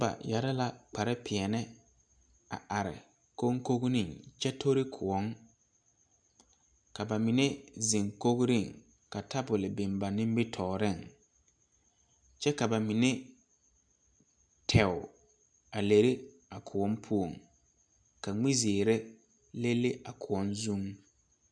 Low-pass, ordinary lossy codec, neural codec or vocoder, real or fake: 9.9 kHz; MP3, 96 kbps; none; real